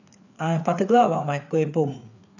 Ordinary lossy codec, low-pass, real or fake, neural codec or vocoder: none; 7.2 kHz; fake; codec, 16 kHz, 4 kbps, FreqCodec, larger model